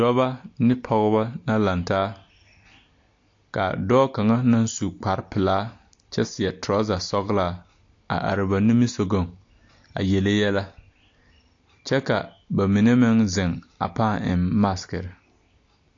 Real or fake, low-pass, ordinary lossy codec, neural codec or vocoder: real; 7.2 kHz; AAC, 48 kbps; none